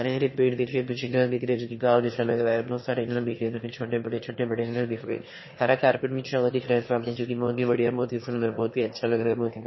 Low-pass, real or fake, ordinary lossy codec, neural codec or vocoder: 7.2 kHz; fake; MP3, 24 kbps; autoencoder, 22.05 kHz, a latent of 192 numbers a frame, VITS, trained on one speaker